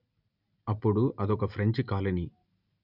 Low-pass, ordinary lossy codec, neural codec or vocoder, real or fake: 5.4 kHz; none; none; real